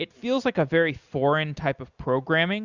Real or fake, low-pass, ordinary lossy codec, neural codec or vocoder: real; 7.2 kHz; Opus, 64 kbps; none